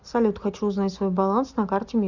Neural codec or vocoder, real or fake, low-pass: none; real; 7.2 kHz